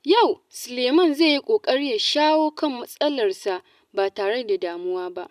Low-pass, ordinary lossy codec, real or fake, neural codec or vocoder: 14.4 kHz; none; real; none